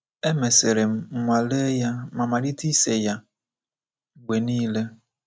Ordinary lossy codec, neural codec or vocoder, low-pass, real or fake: none; none; none; real